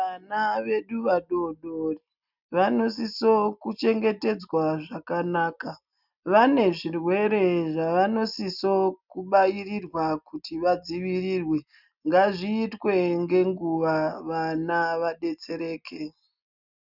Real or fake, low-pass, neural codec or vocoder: real; 5.4 kHz; none